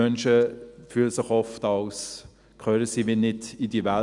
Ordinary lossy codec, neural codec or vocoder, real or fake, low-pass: none; none; real; 10.8 kHz